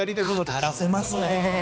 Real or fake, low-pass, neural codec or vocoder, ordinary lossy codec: fake; none; codec, 16 kHz, 2 kbps, X-Codec, HuBERT features, trained on balanced general audio; none